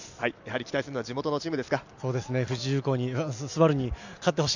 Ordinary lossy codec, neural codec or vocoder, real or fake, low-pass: none; none; real; 7.2 kHz